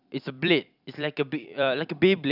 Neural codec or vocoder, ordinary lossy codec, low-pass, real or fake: vocoder, 44.1 kHz, 128 mel bands every 256 samples, BigVGAN v2; none; 5.4 kHz; fake